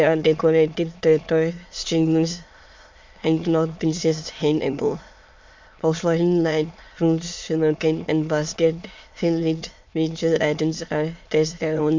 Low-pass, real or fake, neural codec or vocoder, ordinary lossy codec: 7.2 kHz; fake; autoencoder, 22.05 kHz, a latent of 192 numbers a frame, VITS, trained on many speakers; MP3, 48 kbps